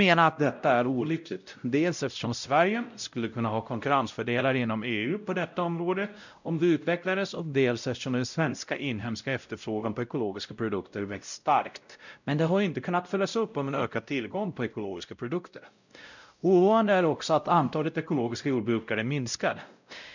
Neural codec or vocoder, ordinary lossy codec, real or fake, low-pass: codec, 16 kHz, 0.5 kbps, X-Codec, WavLM features, trained on Multilingual LibriSpeech; none; fake; 7.2 kHz